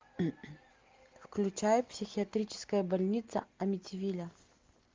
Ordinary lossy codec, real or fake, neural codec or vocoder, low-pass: Opus, 32 kbps; real; none; 7.2 kHz